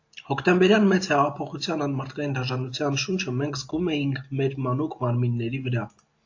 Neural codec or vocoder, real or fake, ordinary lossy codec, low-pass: none; real; AAC, 48 kbps; 7.2 kHz